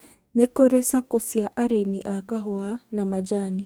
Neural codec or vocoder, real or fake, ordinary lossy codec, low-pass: codec, 44.1 kHz, 2.6 kbps, DAC; fake; none; none